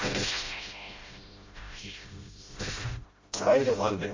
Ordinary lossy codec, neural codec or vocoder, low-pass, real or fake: MP3, 32 kbps; codec, 16 kHz, 0.5 kbps, FreqCodec, smaller model; 7.2 kHz; fake